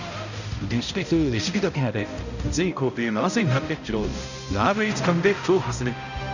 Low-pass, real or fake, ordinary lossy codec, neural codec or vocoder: 7.2 kHz; fake; none; codec, 16 kHz, 0.5 kbps, X-Codec, HuBERT features, trained on balanced general audio